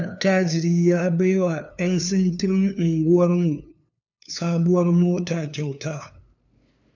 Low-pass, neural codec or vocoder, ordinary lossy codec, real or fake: 7.2 kHz; codec, 16 kHz, 2 kbps, FunCodec, trained on LibriTTS, 25 frames a second; none; fake